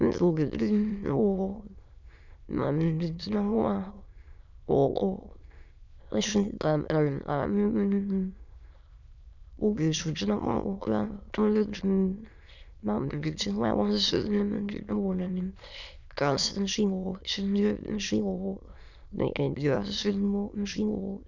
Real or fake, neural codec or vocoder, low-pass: fake; autoencoder, 22.05 kHz, a latent of 192 numbers a frame, VITS, trained on many speakers; 7.2 kHz